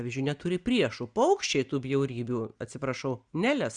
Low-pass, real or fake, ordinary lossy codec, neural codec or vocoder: 9.9 kHz; real; Opus, 64 kbps; none